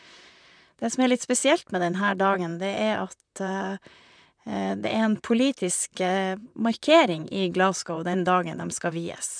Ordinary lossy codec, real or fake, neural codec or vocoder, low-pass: none; fake; vocoder, 44.1 kHz, 128 mel bands, Pupu-Vocoder; 9.9 kHz